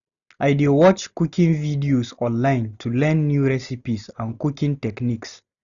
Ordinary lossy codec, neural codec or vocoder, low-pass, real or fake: none; none; 7.2 kHz; real